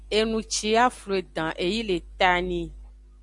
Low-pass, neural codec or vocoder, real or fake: 10.8 kHz; none; real